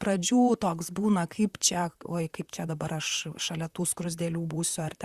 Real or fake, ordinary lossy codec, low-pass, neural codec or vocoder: fake; Opus, 64 kbps; 14.4 kHz; vocoder, 44.1 kHz, 128 mel bands, Pupu-Vocoder